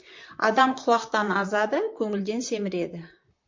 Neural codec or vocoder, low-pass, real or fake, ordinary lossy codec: vocoder, 44.1 kHz, 128 mel bands, Pupu-Vocoder; 7.2 kHz; fake; MP3, 48 kbps